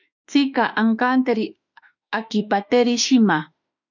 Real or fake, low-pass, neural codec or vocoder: fake; 7.2 kHz; autoencoder, 48 kHz, 32 numbers a frame, DAC-VAE, trained on Japanese speech